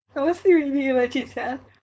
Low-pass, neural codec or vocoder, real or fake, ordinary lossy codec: none; codec, 16 kHz, 4.8 kbps, FACodec; fake; none